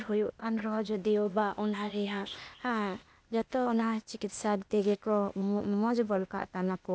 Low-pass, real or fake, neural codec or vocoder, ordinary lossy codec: none; fake; codec, 16 kHz, 0.8 kbps, ZipCodec; none